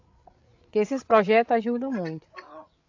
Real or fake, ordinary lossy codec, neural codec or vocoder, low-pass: fake; AAC, 48 kbps; codec, 16 kHz, 8 kbps, FreqCodec, larger model; 7.2 kHz